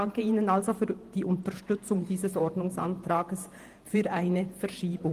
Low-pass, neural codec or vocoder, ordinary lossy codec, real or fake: 14.4 kHz; vocoder, 48 kHz, 128 mel bands, Vocos; Opus, 24 kbps; fake